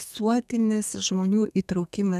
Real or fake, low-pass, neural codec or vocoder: fake; 14.4 kHz; codec, 32 kHz, 1.9 kbps, SNAC